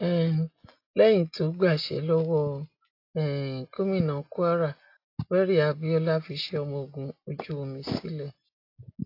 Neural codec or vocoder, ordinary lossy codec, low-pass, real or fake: none; AAC, 32 kbps; 5.4 kHz; real